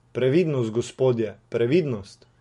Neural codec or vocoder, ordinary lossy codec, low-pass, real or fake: none; MP3, 48 kbps; 14.4 kHz; real